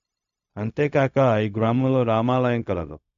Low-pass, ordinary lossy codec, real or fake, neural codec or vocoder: 7.2 kHz; none; fake; codec, 16 kHz, 0.4 kbps, LongCat-Audio-Codec